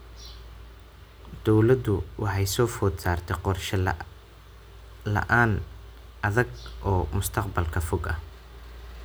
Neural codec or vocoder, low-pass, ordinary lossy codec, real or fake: none; none; none; real